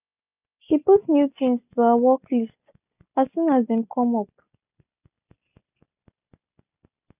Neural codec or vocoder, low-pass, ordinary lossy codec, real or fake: none; 3.6 kHz; none; real